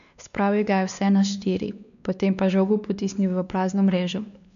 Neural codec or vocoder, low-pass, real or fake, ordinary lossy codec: codec, 16 kHz, 2 kbps, X-Codec, WavLM features, trained on Multilingual LibriSpeech; 7.2 kHz; fake; none